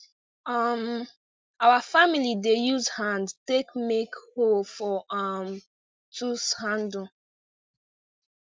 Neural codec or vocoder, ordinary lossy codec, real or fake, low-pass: none; none; real; none